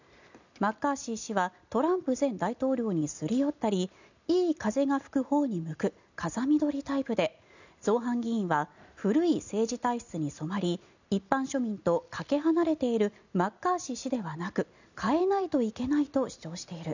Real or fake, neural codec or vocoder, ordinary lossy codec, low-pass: real; none; none; 7.2 kHz